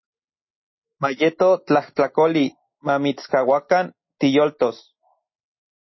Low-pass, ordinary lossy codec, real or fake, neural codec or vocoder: 7.2 kHz; MP3, 24 kbps; real; none